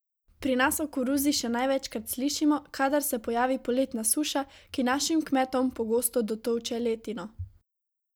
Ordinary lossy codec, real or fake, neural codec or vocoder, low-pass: none; real; none; none